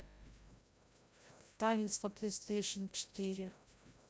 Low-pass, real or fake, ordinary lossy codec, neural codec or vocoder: none; fake; none; codec, 16 kHz, 0.5 kbps, FreqCodec, larger model